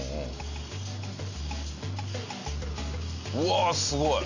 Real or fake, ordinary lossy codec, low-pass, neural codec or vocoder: real; none; 7.2 kHz; none